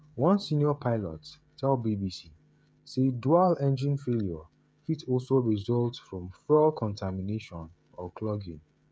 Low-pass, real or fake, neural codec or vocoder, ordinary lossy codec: none; fake; codec, 16 kHz, 16 kbps, FreqCodec, smaller model; none